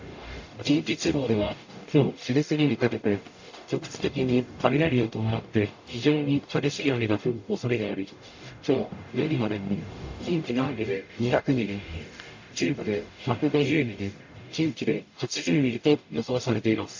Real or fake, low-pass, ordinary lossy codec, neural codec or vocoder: fake; 7.2 kHz; AAC, 48 kbps; codec, 44.1 kHz, 0.9 kbps, DAC